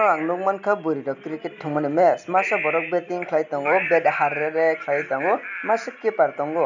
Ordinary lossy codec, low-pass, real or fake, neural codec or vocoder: none; 7.2 kHz; real; none